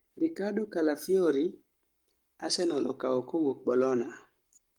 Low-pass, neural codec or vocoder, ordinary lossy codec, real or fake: 19.8 kHz; codec, 44.1 kHz, 7.8 kbps, Pupu-Codec; Opus, 32 kbps; fake